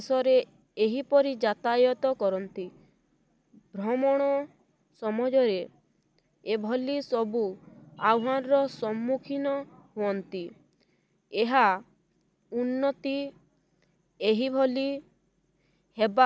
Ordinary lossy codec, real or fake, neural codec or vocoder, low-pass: none; real; none; none